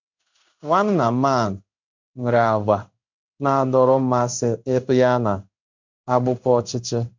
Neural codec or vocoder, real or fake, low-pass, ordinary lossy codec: codec, 16 kHz in and 24 kHz out, 1 kbps, XY-Tokenizer; fake; 7.2 kHz; MP3, 64 kbps